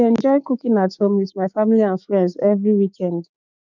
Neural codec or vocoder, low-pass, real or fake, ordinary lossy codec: codec, 16 kHz, 6 kbps, DAC; 7.2 kHz; fake; none